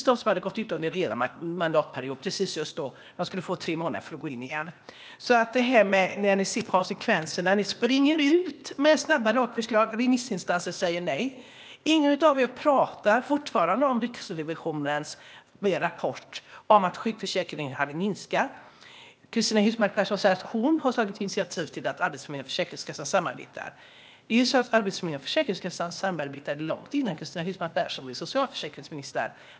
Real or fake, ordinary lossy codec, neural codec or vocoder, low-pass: fake; none; codec, 16 kHz, 0.8 kbps, ZipCodec; none